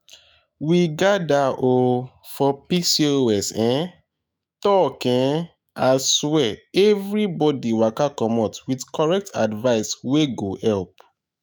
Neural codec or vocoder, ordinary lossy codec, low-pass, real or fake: autoencoder, 48 kHz, 128 numbers a frame, DAC-VAE, trained on Japanese speech; none; none; fake